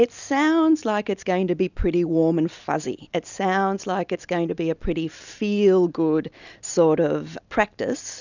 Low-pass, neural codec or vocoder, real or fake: 7.2 kHz; none; real